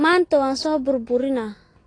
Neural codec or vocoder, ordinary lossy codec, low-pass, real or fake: none; AAC, 32 kbps; 9.9 kHz; real